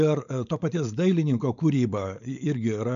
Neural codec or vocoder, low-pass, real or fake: codec, 16 kHz, 4.8 kbps, FACodec; 7.2 kHz; fake